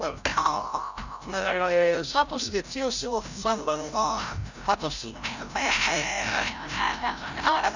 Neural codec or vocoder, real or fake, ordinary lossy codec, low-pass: codec, 16 kHz, 0.5 kbps, FreqCodec, larger model; fake; none; 7.2 kHz